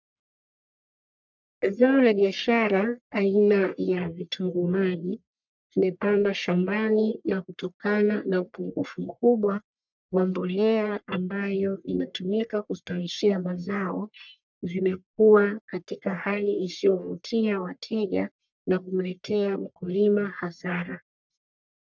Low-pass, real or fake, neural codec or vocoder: 7.2 kHz; fake; codec, 44.1 kHz, 1.7 kbps, Pupu-Codec